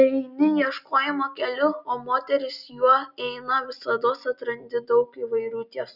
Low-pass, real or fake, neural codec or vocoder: 5.4 kHz; real; none